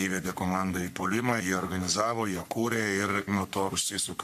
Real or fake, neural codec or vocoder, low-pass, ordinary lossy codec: fake; codec, 44.1 kHz, 3.4 kbps, Pupu-Codec; 14.4 kHz; MP3, 96 kbps